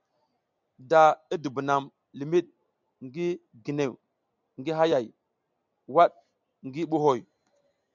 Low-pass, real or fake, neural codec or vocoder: 7.2 kHz; real; none